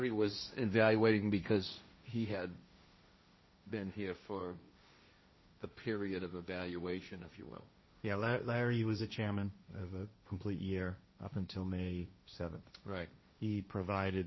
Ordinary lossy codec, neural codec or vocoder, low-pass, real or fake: MP3, 24 kbps; codec, 16 kHz, 1.1 kbps, Voila-Tokenizer; 7.2 kHz; fake